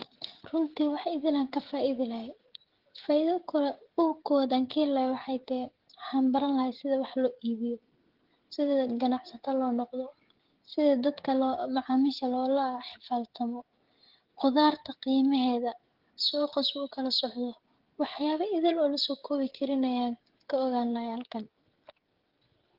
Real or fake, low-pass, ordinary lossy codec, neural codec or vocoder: fake; 5.4 kHz; Opus, 16 kbps; codec, 16 kHz, 16 kbps, FreqCodec, smaller model